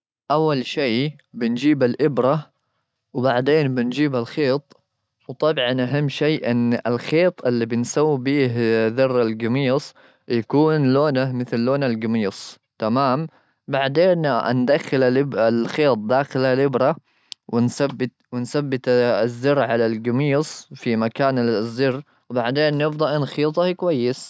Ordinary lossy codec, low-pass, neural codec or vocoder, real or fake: none; none; none; real